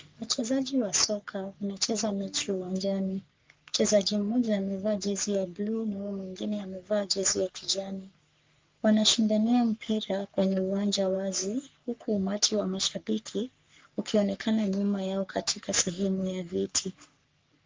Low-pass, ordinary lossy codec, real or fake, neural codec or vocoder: 7.2 kHz; Opus, 32 kbps; fake; codec, 44.1 kHz, 3.4 kbps, Pupu-Codec